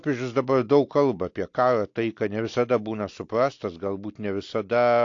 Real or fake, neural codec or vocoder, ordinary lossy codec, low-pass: real; none; AAC, 48 kbps; 7.2 kHz